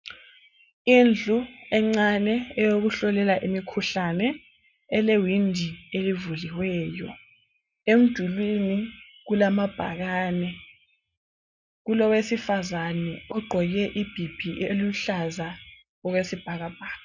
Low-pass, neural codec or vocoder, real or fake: 7.2 kHz; none; real